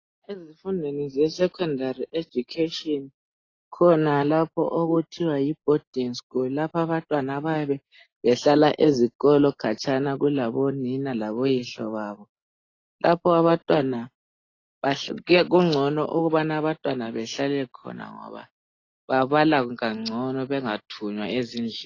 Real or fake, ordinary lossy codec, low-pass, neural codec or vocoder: real; AAC, 32 kbps; 7.2 kHz; none